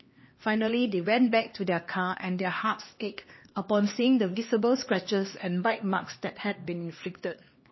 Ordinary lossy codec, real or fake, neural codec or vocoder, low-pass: MP3, 24 kbps; fake; codec, 16 kHz, 2 kbps, X-Codec, HuBERT features, trained on LibriSpeech; 7.2 kHz